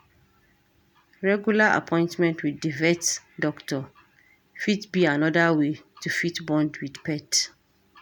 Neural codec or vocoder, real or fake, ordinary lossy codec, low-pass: none; real; none; 19.8 kHz